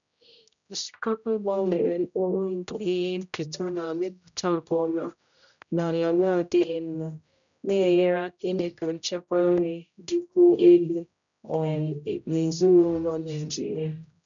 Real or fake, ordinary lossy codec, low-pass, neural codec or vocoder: fake; none; 7.2 kHz; codec, 16 kHz, 0.5 kbps, X-Codec, HuBERT features, trained on general audio